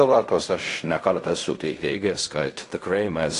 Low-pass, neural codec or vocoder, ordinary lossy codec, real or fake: 10.8 kHz; codec, 16 kHz in and 24 kHz out, 0.4 kbps, LongCat-Audio-Codec, fine tuned four codebook decoder; AAC, 48 kbps; fake